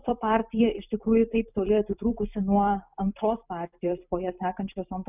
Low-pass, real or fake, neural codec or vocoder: 3.6 kHz; fake; vocoder, 44.1 kHz, 128 mel bands every 256 samples, BigVGAN v2